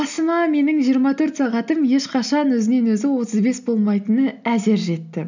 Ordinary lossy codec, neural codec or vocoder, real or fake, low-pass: none; none; real; 7.2 kHz